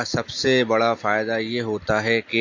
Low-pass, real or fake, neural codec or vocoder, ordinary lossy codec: 7.2 kHz; real; none; AAC, 48 kbps